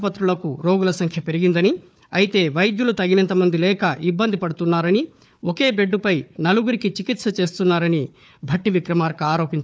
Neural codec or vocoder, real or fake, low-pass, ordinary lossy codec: codec, 16 kHz, 4 kbps, FunCodec, trained on Chinese and English, 50 frames a second; fake; none; none